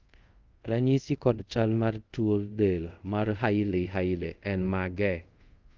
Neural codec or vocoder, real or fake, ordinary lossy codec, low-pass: codec, 24 kHz, 0.5 kbps, DualCodec; fake; Opus, 24 kbps; 7.2 kHz